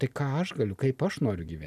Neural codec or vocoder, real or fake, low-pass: none; real; 14.4 kHz